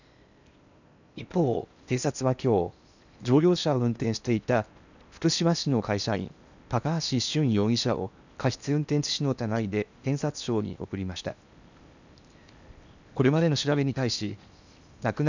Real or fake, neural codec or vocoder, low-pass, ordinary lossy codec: fake; codec, 16 kHz in and 24 kHz out, 0.8 kbps, FocalCodec, streaming, 65536 codes; 7.2 kHz; none